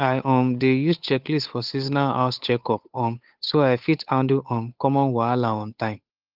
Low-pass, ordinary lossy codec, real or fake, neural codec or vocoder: 5.4 kHz; Opus, 24 kbps; fake; codec, 16 kHz, 8 kbps, FunCodec, trained on Chinese and English, 25 frames a second